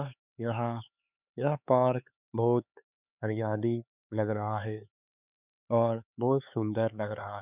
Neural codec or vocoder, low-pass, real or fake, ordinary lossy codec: codec, 16 kHz, 4 kbps, X-Codec, HuBERT features, trained on LibriSpeech; 3.6 kHz; fake; none